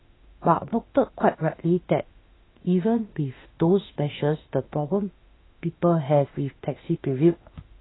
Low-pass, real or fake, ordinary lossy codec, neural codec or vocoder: 7.2 kHz; fake; AAC, 16 kbps; autoencoder, 48 kHz, 32 numbers a frame, DAC-VAE, trained on Japanese speech